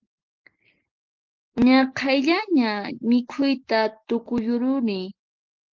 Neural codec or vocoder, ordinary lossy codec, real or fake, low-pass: none; Opus, 16 kbps; real; 7.2 kHz